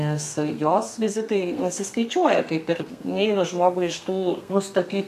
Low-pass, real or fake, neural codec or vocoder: 14.4 kHz; fake; codec, 32 kHz, 1.9 kbps, SNAC